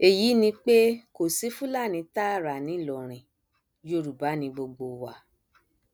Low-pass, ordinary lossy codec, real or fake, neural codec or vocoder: none; none; real; none